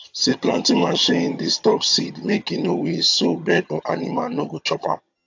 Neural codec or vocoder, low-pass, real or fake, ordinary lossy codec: vocoder, 22.05 kHz, 80 mel bands, HiFi-GAN; 7.2 kHz; fake; AAC, 48 kbps